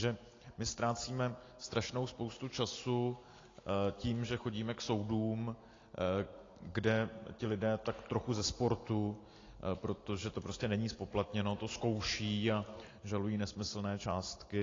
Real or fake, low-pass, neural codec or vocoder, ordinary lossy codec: real; 7.2 kHz; none; AAC, 32 kbps